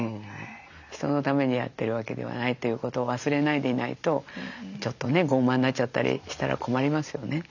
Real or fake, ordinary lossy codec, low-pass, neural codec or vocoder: real; none; 7.2 kHz; none